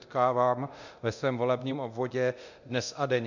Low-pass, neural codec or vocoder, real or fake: 7.2 kHz; codec, 24 kHz, 0.9 kbps, DualCodec; fake